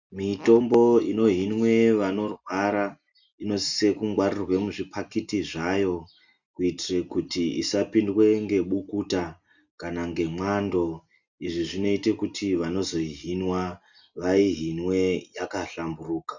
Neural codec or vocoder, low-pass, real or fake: none; 7.2 kHz; real